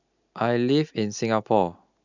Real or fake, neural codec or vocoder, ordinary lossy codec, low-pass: real; none; none; 7.2 kHz